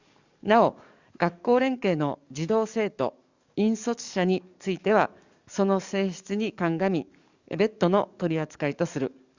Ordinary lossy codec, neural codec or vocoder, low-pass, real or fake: Opus, 64 kbps; codec, 44.1 kHz, 7.8 kbps, DAC; 7.2 kHz; fake